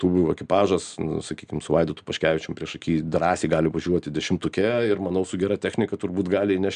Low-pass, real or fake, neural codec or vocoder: 9.9 kHz; real; none